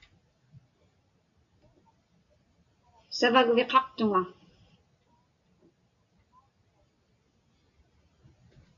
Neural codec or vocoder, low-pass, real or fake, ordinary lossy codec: none; 7.2 kHz; real; MP3, 96 kbps